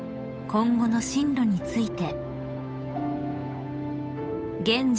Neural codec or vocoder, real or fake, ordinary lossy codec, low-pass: codec, 16 kHz, 8 kbps, FunCodec, trained on Chinese and English, 25 frames a second; fake; none; none